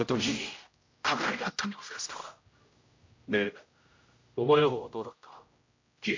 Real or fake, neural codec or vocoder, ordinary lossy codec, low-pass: fake; codec, 16 kHz, 0.5 kbps, X-Codec, HuBERT features, trained on general audio; MP3, 48 kbps; 7.2 kHz